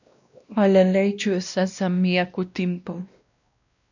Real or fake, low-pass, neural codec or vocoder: fake; 7.2 kHz; codec, 16 kHz, 1 kbps, X-Codec, WavLM features, trained on Multilingual LibriSpeech